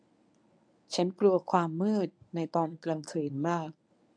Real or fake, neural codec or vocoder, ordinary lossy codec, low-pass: fake; codec, 24 kHz, 0.9 kbps, WavTokenizer, medium speech release version 1; MP3, 96 kbps; 9.9 kHz